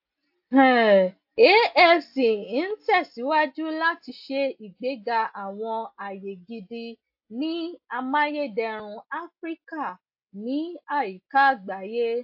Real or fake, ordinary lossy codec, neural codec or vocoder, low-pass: real; AAC, 48 kbps; none; 5.4 kHz